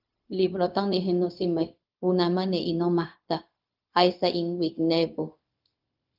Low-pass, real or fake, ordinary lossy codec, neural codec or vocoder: 5.4 kHz; fake; Opus, 32 kbps; codec, 16 kHz, 0.4 kbps, LongCat-Audio-Codec